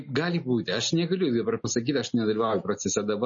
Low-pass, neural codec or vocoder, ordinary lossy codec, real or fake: 7.2 kHz; none; MP3, 32 kbps; real